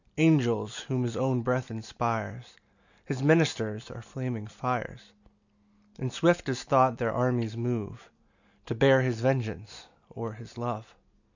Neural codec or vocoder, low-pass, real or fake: none; 7.2 kHz; real